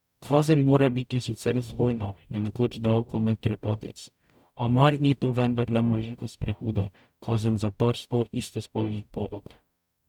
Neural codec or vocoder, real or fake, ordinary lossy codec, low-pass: codec, 44.1 kHz, 0.9 kbps, DAC; fake; none; 19.8 kHz